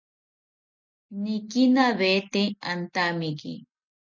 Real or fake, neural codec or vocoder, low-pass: real; none; 7.2 kHz